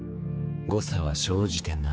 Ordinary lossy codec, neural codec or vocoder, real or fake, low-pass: none; codec, 16 kHz, 4 kbps, X-Codec, HuBERT features, trained on general audio; fake; none